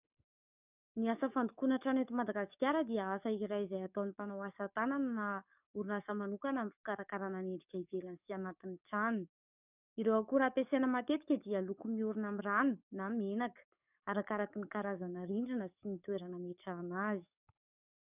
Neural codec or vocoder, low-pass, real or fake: codec, 44.1 kHz, 7.8 kbps, DAC; 3.6 kHz; fake